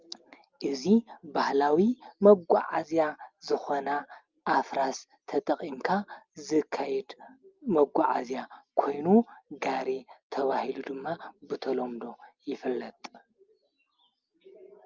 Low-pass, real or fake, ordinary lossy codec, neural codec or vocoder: 7.2 kHz; real; Opus, 24 kbps; none